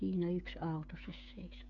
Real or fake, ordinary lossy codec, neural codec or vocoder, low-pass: fake; none; codec, 16 kHz, 16 kbps, FreqCodec, smaller model; 7.2 kHz